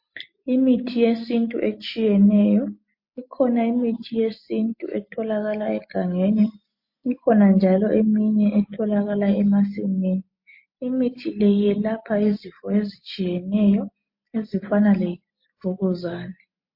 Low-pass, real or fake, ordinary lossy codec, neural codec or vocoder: 5.4 kHz; real; MP3, 32 kbps; none